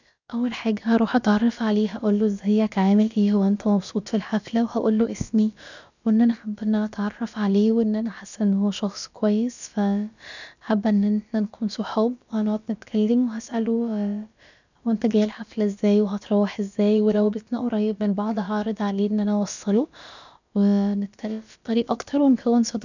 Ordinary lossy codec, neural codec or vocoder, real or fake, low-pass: none; codec, 16 kHz, about 1 kbps, DyCAST, with the encoder's durations; fake; 7.2 kHz